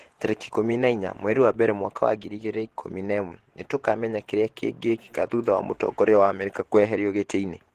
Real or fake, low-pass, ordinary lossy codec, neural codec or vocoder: fake; 14.4 kHz; Opus, 16 kbps; vocoder, 48 kHz, 128 mel bands, Vocos